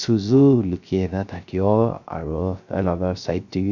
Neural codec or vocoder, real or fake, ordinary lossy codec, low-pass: codec, 16 kHz, 0.3 kbps, FocalCodec; fake; none; 7.2 kHz